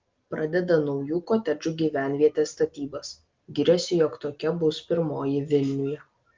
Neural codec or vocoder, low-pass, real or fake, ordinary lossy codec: none; 7.2 kHz; real; Opus, 32 kbps